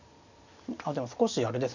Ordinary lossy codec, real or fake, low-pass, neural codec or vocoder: none; real; 7.2 kHz; none